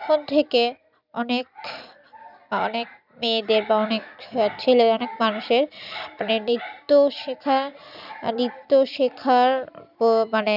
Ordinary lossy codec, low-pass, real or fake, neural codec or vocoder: none; 5.4 kHz; real; none